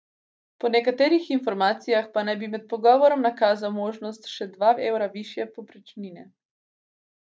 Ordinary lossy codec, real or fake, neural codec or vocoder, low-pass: none; real; none; none